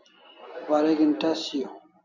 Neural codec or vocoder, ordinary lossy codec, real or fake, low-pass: none; Opus, 64 kbps; real; 7.2 kHz